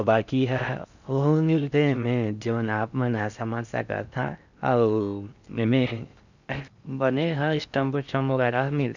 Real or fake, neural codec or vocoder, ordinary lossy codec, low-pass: fake; codec, 16 kHz in and 24 kHz out, 0.6 kbps, FocalCodec, streaming, 4096 codes; none; 7.2 kHz